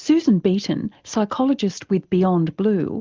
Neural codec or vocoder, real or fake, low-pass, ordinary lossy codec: none; real; 7.2 kHz; Opus, 24 kbps